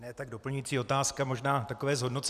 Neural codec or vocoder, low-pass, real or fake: vocoder, 44.1 kHz, 128 mel bands every 256 samples, BigVGAN v2; 14.4 kHz; fake